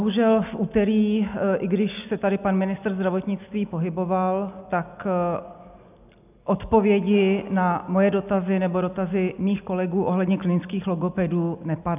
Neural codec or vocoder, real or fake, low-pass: none; real; 3.6 kHz